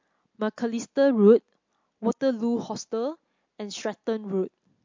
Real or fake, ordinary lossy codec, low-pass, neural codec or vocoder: real; MP3, 48 kbps; 7.2 kHz; none